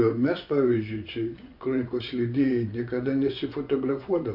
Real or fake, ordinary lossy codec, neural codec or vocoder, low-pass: fake; AAC, 48 kbps; vocoder, 24 kHz, 100 mel bands, Vocos; 5.4 kHz